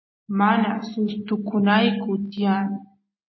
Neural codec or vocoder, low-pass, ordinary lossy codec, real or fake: none; 7.2 kHz; MP3, 24 kbps; real